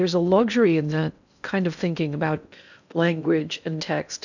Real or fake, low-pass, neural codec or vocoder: fake; 7.2 kHz; codec, 16 kHz in and 24 kHz out, 0.6 kbps, FocalCodec, streaming, 4096 codes